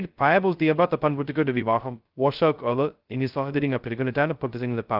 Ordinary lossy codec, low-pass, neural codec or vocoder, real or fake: Opus, 32 kbps; 5.4 kHz; codec, 16 kHz, 0.2 kbps, FocalCodec; fake